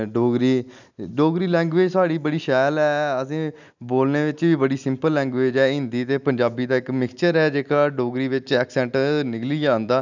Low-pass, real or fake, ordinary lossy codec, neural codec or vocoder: 7.2 kHz; real; none; none